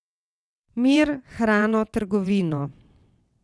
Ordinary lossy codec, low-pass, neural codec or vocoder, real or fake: none; none; vocoder, 22.05 kHz, 80 mel bands, WaveNeXt; fake